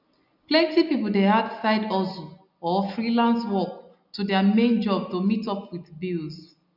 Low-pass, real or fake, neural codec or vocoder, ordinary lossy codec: 5.4 kHz; real; none; none